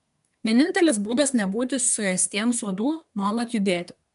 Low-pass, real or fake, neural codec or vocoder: 10.8 kHz; fake; codec, 24 kHz, 1 kbps, SNAC